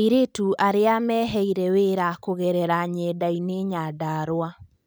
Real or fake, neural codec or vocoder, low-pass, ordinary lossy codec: real; none; none; none